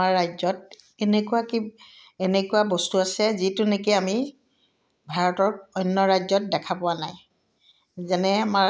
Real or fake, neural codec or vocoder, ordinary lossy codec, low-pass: real; none; none; none